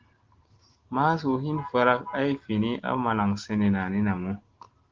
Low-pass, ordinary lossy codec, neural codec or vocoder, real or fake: 7.2 kHz; Opus, 16 kbps; none; real